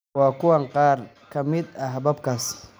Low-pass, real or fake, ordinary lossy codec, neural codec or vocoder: none; real; none; none